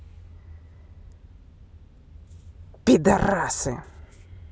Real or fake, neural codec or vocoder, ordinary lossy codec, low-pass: real; none; none; none